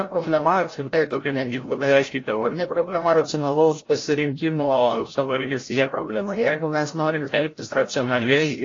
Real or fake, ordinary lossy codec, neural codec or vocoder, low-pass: fake; AAC, 32 kbps; codec, 16 kHz, 0.5 kbps, FreqCodec, larger model; 7.2 kHz